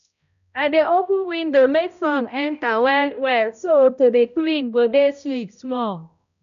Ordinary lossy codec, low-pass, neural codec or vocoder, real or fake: none; 7.2 kHz; codec, 16 kHz, 0.5 kbps, X-Codec, HuBERT features, trained on balanced general audio; fake